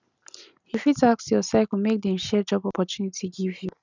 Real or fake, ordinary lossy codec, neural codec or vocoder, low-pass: real; none; none; 7.2 kHz